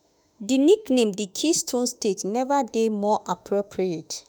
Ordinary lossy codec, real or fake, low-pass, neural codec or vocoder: none; fake; none; autoencoder, 48 kHz, 32 numbers a frame, DAC-VAE, trained on Japanese speech